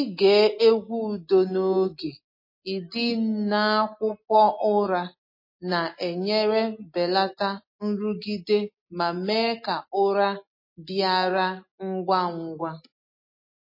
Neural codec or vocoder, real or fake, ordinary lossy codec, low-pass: none; real; MP3, 24 kbps; 5.4 kHz